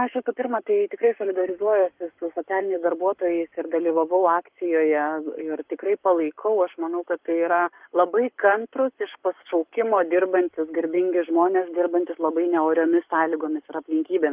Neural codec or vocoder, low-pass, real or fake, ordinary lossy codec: codec, 44.1 kHz, 7.8 kbps, Pupu-Codec; 3.6 kHz; fake; Opus, 32 kbps